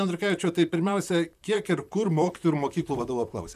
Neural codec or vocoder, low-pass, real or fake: vocoder, 44.1 kHz, 128 mel bands, Pupu-Vocoder; 14.4 kHz; fake